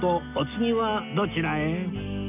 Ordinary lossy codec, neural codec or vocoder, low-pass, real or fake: none; none; 3.6 kHz; real